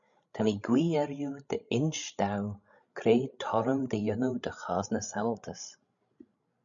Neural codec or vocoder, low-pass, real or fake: codec, 16 kHz, 16 kbps, FreqCodec, larger model; 7.2 kHz; fake